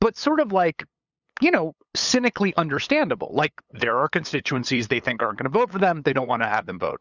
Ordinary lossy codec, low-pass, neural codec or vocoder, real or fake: Opus, 64 kbps; 7.2 kHz; codec, 16 kHz, 8 kbps, FunCodec, trained on LibriTTS, 25 frames a second; fake